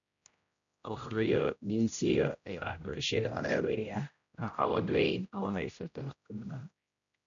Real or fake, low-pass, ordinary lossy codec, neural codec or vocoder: fake; 7.2 kHz; none; codec, 16 kHz, 0.5 kbps, X-Codec, HuBERT features, trained on general audio